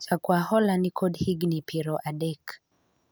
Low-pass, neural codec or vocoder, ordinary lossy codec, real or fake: none; none; none; real